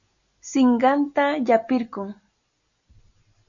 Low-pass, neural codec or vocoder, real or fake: 7.2 kHz; none; real